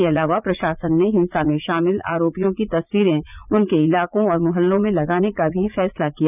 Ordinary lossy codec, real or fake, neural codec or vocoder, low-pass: none; fake; vocoder, 44.1 kHz, 80 mel bands, Vocos; 3.6 kHz